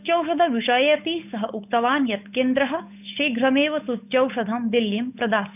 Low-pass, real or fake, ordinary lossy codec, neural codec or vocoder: 3.6 kHz; fake; none; codec, 16 kHz, 8 kbps, FunCodec, trained on Chinese and English, 25 frames a second